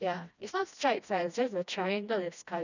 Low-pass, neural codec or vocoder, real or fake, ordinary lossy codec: 7.2 kHz; codec, 16 kHz, 1 kbps, FreqCodec, smaller model; fake; none